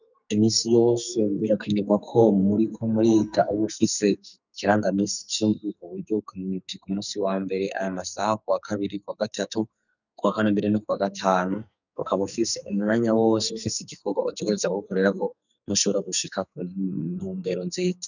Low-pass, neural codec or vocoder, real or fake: 7.2 kHz; codec, 44.1 kHz, 2.6 kbps, SNAC; fake